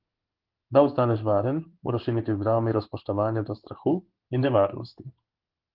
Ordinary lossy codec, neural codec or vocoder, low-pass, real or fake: Opus, 16 kbps; codec, 16 kHz in and 24 kHz out, 1 kbps, XY-Tokenizer; 5.4 kHz; fake